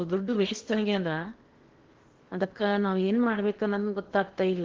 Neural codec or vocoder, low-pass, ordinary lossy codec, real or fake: codec, 16 kHz in and 24 kHz out, 0.8 kbps, FocalCodec, streaming, 65536 codes; 7.2 kHz; Opus, 16 kbps; fake